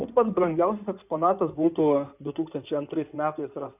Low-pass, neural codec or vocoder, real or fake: 3.6 kHz; codec, 16 kHz in and 24 kHz out, 2.2 kbps, FireRedTTS-2 codec; fake